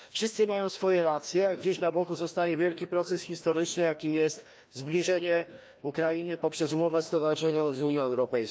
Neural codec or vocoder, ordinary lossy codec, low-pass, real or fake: codec, 16 kHz, 1 kbps, FreqCodec, larger model; none; none; fake